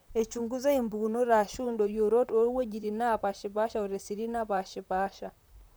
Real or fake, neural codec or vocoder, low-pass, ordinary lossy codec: fake; vocoder, 44.1 kHz, 128 mel bands, Pupu-Vocoder; none; none